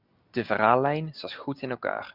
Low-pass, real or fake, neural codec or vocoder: 5.4 kHz; real; none